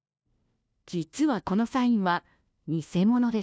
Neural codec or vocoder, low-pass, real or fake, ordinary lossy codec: codec, 16 kHz, 1 kbps, FunCodec, trained on LibriTTS, 50 frames a second; none; fake; none